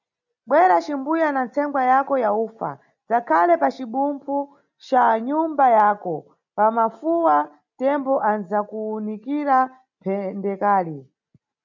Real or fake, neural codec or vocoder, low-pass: real; none; 7.2 kHz